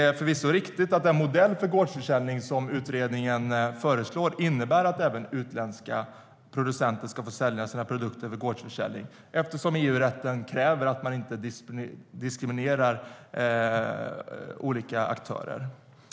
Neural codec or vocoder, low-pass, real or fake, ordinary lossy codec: none; none; real; none